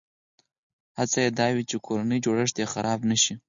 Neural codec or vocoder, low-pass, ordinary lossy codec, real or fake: none; 7.2 kHz; Opus, 64 kbps; real